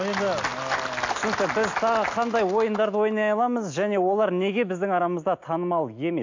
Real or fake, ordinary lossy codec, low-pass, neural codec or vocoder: real; AAC, 48 kbps; 7.2 kHz; none